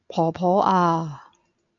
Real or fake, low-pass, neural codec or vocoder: real; 7.2 kHz; none